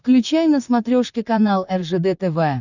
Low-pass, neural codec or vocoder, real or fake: 7.2 kHz; vocoder, 44.1 kHz, 128 mel bands, Pupu-Vocoder; fake